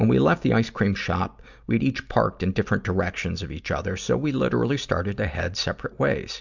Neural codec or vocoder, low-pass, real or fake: none; 7.2 kHz; real